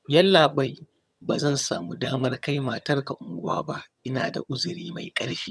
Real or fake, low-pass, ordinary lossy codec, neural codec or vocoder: fake; none; none; vocoder, 22.05 kHz, 80 mel bands, HiFi-GAN